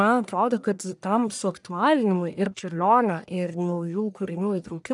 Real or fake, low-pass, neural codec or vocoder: fake; 10.8 kHz; codec, 44.1 kHz, 1.7 kbps, Pupu-Codec